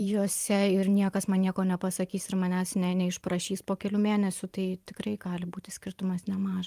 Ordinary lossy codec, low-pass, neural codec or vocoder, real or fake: Opus, 32 kbps; 14.4 kHz; vocoder, 44.1 kHz, 128 mel bands every 512 samples, BigVGAN v2; fake